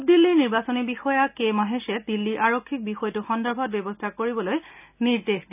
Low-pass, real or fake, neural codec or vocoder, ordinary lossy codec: 3.6 kHz; real; none; none